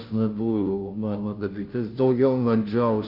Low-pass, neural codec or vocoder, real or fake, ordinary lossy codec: 5.4 kHz; codec, 16 kHz, 0.5 kbps, FunCodec, trained on Chinese and English, 25 frames a second; fake; Opus, 24 kbps